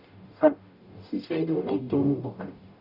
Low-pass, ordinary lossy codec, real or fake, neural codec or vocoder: 5.4 kHz; none; fake; codec, 44.1 kHz, 0.9 kbps, DAC